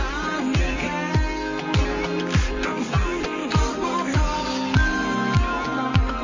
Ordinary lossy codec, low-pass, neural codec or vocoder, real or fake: MP3, 32 kbps; 7.2 kHz; codec, 24 kHz, 0.9 kbps, WavTokenizer, medium music audio release; fake